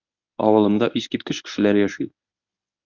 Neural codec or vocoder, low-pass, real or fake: codec, 24 kHz, 0.9 kbps, WavTokenizer, medium speech release version 1; 7.2 kHz; fake